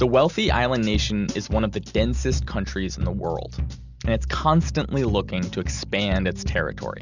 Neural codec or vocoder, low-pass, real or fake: none; 7.2 kHz; real